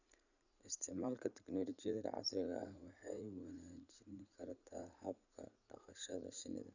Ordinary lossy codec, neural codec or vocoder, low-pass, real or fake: none; vocoder, 44.1 kHz, 80 mel bands, Vocos; 7.2 kHz; fake